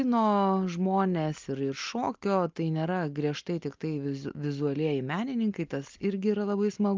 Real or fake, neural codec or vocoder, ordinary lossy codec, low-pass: real; none; Opus, 24 kbps; 7.2 kHz